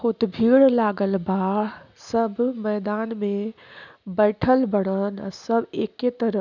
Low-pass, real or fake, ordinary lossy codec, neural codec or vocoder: 7.2 kHz; real; none; none